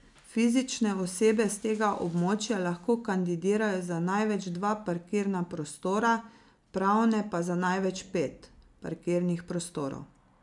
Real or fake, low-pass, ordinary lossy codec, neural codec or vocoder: real; 10.8 kHz; none; none